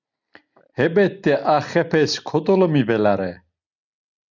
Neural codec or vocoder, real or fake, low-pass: none; real; 7.2 kHz